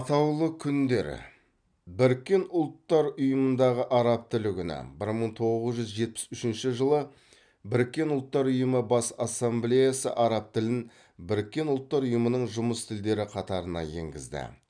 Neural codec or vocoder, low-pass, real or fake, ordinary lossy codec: none; 9.9 kHz; real; none